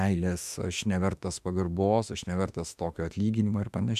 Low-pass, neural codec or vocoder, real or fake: 14.4 kHz; autoencoder, 48 kHz, 32 numbers a frame, DAC-VAE, trained on Japanese speech; fake